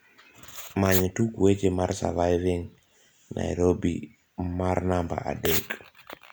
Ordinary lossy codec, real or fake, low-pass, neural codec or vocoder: none; real; none; none